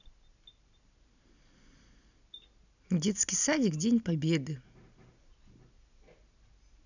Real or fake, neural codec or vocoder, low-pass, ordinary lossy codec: real; none; 7.2 kHz; none